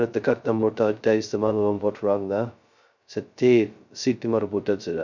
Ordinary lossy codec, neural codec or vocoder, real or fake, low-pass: none; codec, 16 kHz, 0.2 kbps, FocalCodec; fake; 7.2 kHz